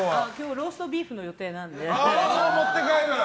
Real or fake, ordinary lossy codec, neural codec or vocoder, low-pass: real; none; none; none